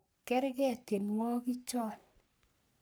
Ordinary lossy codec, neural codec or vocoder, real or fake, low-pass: none; codec, 44.1 kHz, 3.4 kbps, Pupu-Codec; fake; none